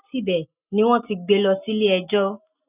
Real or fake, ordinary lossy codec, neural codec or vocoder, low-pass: real; none; none; 3.6 kHz